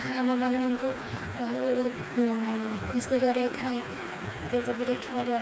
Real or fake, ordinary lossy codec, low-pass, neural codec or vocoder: fake; none; none; codec, 16 kHz, 2 kbps, FreqCodec, smaller model